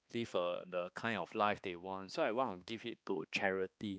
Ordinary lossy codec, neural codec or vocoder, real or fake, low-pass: none; codec, 16 kHz, 2 kbps, X-Codec, HuBERT features, trained on balanced general audio; fake; none